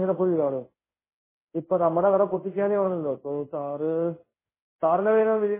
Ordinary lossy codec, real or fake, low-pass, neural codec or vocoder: MP3, 16 kbps; fake; 3.6 kHz; codec, 16 kHz in and 24 kHz out, 1 kbps, XY-Tokenizer